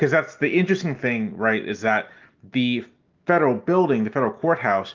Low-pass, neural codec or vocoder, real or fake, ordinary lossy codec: 7.2 kHz; none; real; Opus, 32 kbps